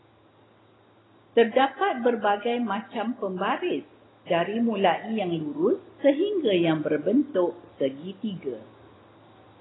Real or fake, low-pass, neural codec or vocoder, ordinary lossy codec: real; 7.2 kHz; none; AAC, 16 kbps